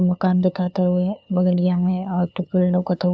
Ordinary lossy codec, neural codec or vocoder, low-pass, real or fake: none; codec, 16 kHz, 2 kbps, FunCodec, trained on LibriTTS, 25 frames a second; none; fake